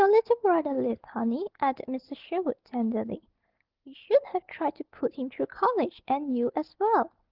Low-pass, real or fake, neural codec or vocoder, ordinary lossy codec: 5.4 kHz; real; none; Opus, 16 kbps